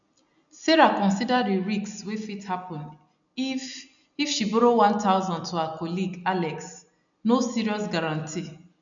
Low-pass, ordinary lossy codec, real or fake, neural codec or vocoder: 7.2 kHz; none; real; none